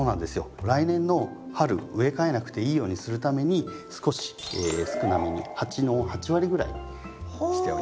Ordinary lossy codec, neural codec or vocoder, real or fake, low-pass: none; none; real; none